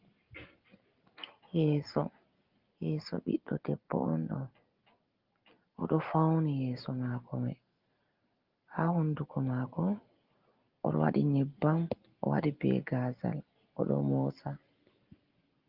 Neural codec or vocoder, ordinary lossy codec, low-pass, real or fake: none; Opus, 32 kbps; 5.4 kHz; real